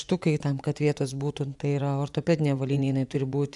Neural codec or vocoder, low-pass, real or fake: vocoder, 24 kHz, 100 mel bands, Vocos; 10.8 kHz; fake